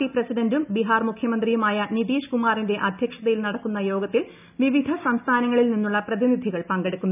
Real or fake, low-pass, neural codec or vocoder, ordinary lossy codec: real; 3.6 kHz; none; none